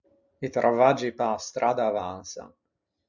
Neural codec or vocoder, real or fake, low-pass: none; real; 7.2 kHz